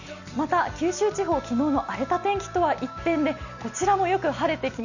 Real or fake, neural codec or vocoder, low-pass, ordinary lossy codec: real; none; 7.2 kHz; none